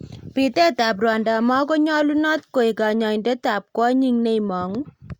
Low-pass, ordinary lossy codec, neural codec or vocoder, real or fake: 19.8 kHz; Opus, 64 kbps; none; real